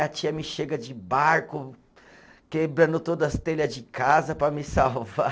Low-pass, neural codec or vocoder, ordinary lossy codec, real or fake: none; none; none; real